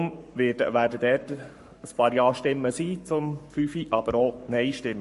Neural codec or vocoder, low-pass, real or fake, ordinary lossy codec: codec, 44.1 kHz, 7.8 kbps, Pupu-Codec; 14.4 kHz; fake; MP3, 48 kbps